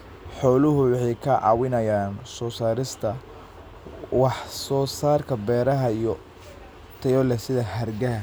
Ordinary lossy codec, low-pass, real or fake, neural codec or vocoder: none; none; real; none